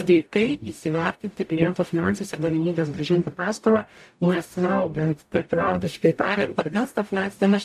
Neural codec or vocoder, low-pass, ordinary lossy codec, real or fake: codec, 44.1 kHz, 0.9 kbps, DAC; 14.4 kHz; AAC, 96 kbps; fake